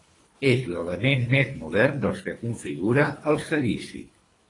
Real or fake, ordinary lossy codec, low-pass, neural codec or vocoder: fake; AAC, 32 kbps; 10.8 kHz; codec, 24 kHz, 3 kbps, HILCodec